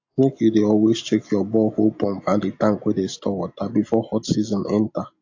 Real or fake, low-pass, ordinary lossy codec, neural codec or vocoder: real; 7.2 kHz; AAC, 48 kbps; none